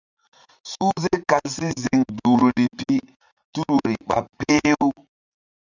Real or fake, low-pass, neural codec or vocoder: fake; 7.2 kHz; vocoder, 44.1 kHz, 80 mel bands, Vocos